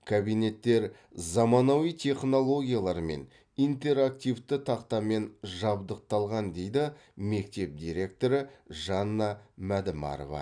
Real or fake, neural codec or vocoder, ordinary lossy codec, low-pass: real; none; none; 9.9 kHz